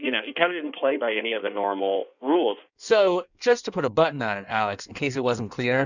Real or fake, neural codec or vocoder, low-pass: fake; codec, 16 kHz in and 24 kHz out, 1.1 kbps, FireRedTTS-2 codec; 7.2 kHz